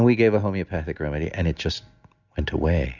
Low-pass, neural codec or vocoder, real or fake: 7.2 kHz; none; real